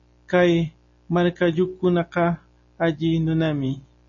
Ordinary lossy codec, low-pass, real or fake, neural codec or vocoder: MP3, 32 kbps; 7.2 kHz; real; none